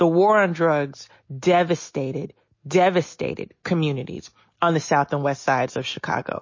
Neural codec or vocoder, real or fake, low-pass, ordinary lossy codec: none; real; 7.2 kHz; MP3, 32 kbps